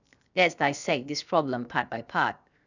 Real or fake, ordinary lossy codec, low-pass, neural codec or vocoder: fake; none; 7.2 kHz; codec, 16 kHz, 0.7 kbps, FocalCodec